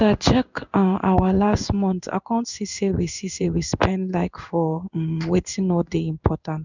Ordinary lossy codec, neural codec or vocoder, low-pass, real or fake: none; codec, 16 kHz in and 24 kHz out, 1 kbps, XY-Tokenizer; 7.2 kHz; fake